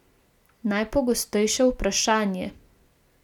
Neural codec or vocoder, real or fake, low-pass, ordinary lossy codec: none; real; 19.8 kHz; none